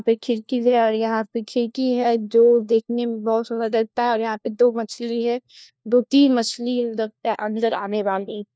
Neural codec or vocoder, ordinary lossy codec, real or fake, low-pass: codec, 16 kHz, 1 kbps, FunCodec, trained on LibriTTS, 50 frames a second; none; fake; none